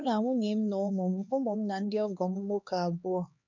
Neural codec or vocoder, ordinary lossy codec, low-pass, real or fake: codec, 16 kHz, 2 kbps, X-Codec, HuBERT features, trained on LibriSpeech; none; 7.2 kHz; fake